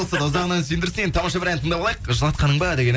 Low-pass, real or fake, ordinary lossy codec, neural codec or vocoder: none; real; none; none